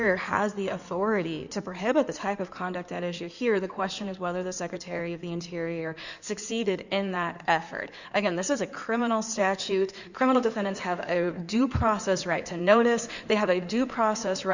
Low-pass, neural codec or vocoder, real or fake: 7.2 kHz; codec, 16 kHz in and 24 kHz out, 2.2 kbps, FireRedTTS-2 codec; fake